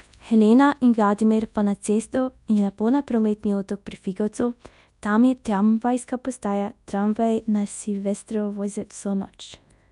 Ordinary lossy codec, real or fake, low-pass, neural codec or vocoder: none; fake; 10.8 kHz; codec, 24 kHz, 0.9 kbps, WavTokenizer, large speech release